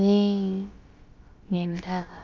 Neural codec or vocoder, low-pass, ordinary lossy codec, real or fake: codec, 16 kHz, about 1 kbps, DyCAST, with the encoder's durations; 7.2 kHz; Opus, 32 kbps; fake